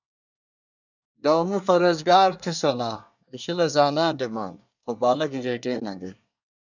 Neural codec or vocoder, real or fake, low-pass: codec, 24 kHz, 1 kbps, SNAC; fake; 7.2 kHz